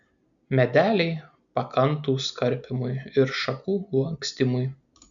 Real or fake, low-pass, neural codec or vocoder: real; 7.2 kHz; none